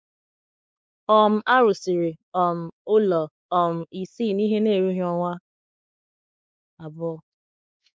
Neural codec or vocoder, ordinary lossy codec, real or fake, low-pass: codec, 16 kHz, 4 kbps, X-Codec, WavLM features, trained on Multilingual LibriSpeech; none; fake; none